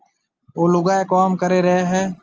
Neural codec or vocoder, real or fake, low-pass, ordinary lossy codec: none; real; 7.2 kHz; Opus, 24 kbps